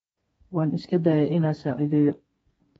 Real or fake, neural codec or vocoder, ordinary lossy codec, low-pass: fake; codec, 32 kHz, 1.9 kbps, SNAC; AAC, 24 kbps; 14.4 kHz